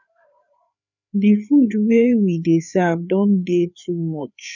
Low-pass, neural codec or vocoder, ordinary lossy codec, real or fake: 7.2 kHz; codec, 16 kHz, 4 kbps, FreqCodec, larger model; none; fake